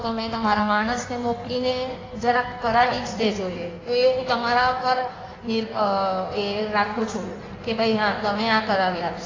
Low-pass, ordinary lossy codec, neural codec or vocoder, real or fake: 7.2 kHz; AAC, 32 kbps; codec, 16 kHz in and 24 kHz out, 1.1 kbps, FireRedTTS-2 codec; fake